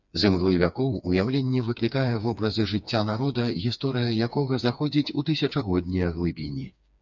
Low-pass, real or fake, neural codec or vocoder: 7.2 kHz; fake; codec, 16 kHz, 4 kbps, FreqCodec, smaller model